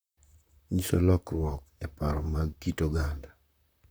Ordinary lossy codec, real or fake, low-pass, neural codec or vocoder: none; fake; none; vocoder, 44.1 kHz, 128 mel bands, Pupu-Vocoder